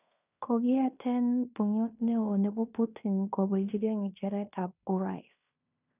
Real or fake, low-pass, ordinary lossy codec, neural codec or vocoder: fake; 3.6 kHz; none; codec, 16 kHz in and 24 kHz out, 0.9 kbps, LongCat-Audio-Codec, fine tuned four codebook decoder